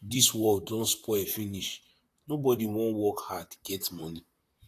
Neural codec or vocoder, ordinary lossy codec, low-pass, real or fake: vocoder, 44.1 kHz, 128 mel bands, Pupu-Vocoder; AAC, 64 kbps; 14.4 kHz; fake